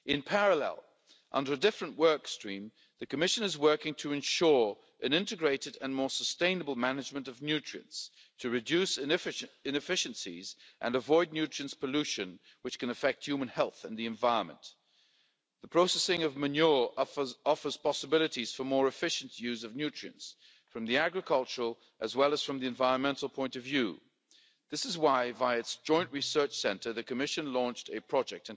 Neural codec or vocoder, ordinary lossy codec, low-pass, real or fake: none; none; none; real